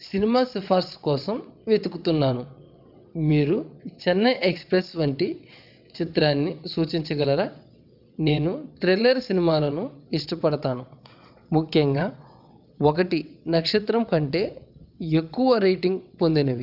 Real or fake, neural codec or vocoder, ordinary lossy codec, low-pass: fake; vocoder, 22.05 kHz, 80 mel bands, WaveNeXt; none; 5.4 kHz